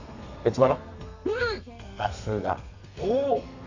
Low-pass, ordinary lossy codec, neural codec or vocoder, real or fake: 7.2 kHz; Opus, 64 kbps; codec, 32 kHz, 1.9 kbps, SNAC; fake